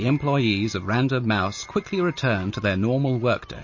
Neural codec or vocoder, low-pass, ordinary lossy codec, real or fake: none; 7.2 kHz; MP3, 32 kbps; real